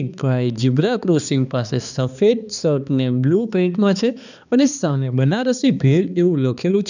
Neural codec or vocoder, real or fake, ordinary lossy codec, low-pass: codec, 16 kHz, 2 kbps, X-Codec, HuBERT features, trained on balanced general audio; fake; none; 7.2 kHz